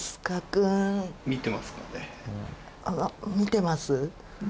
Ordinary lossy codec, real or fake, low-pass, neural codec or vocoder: none; real; none; none